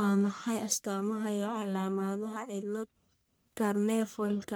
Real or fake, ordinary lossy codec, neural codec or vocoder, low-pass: fake; none; codec, 44.1 kHz, 1.7 kbps, Pupu-Codec; none